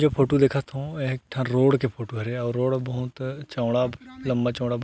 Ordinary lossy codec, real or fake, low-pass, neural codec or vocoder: none; real; none; none